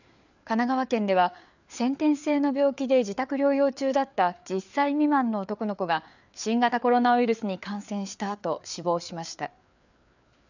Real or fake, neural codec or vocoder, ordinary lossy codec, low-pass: fake; codec, 16 kHz, 4 kbps, FreqCodec, larger model; none; 7.2 kHz